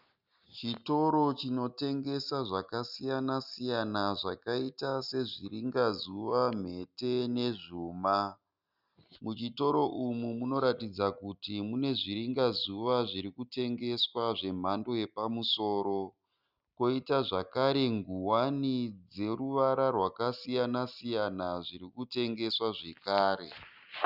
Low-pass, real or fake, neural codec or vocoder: 5.4 kHz; real; none